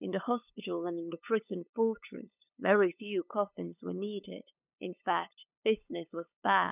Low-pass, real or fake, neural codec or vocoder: 3.6 kHz; fake; codec, 16 kHz, 8 kbps, FunCodec, trained on LibriTTS, 25 frames a second